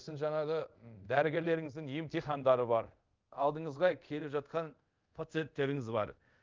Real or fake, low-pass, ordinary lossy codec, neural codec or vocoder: fake; 7.2 kHz; Opus, 24 kbps; codec, 24 kHz, 0.5 kbps, DualCodec